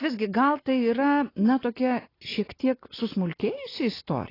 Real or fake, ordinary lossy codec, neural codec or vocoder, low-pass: real; AAC, 24 kbps; none; 5.4 kHz